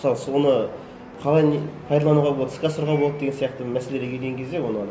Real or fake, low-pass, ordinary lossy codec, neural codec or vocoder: real; none; none; none